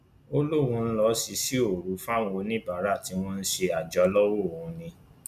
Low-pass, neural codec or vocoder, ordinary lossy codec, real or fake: 14.4 kHz; none; none; real